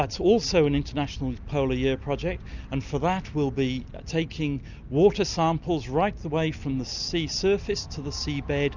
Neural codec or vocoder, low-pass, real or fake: none; 7.2 kHz; real